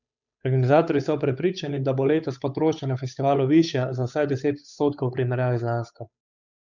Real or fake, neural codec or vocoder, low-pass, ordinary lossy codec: fake; codec, 16 kHz, 8 kbps, FunCodec, trained on Chinese and English, 25 frames a second; 7.2 kHz; none